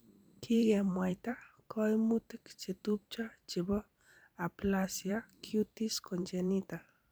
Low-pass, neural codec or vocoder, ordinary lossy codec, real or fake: none; vocoder, 44.1 kHz, 128 mel bands every 512 samples, BigVGAN v2; none; fake